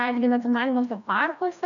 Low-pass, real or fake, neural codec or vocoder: 7.2 kHz; fake; codec, 16 kHz, 1 kbps, FreqCodec, larger model